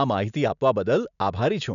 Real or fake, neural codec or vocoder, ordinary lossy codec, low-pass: real; none; none; 7.2 kHz